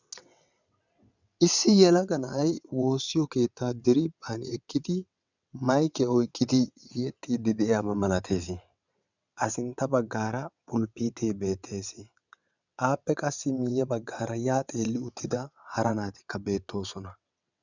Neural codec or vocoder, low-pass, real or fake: vocoder, 22.05 kHz, 80 mel bands, WaveNeXt; 7.2 kHz; fake